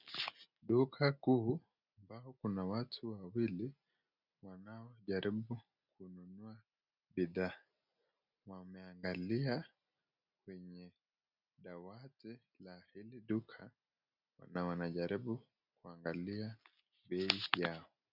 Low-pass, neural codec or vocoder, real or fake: 5.4 kHz; none; real